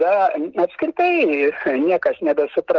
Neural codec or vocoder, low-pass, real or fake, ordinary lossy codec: none; 7.2 kHz; real; Opus, 16 kbps